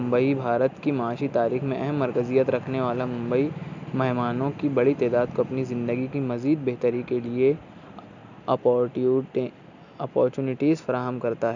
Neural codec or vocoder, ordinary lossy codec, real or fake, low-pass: none; none; real; 7.2 kHz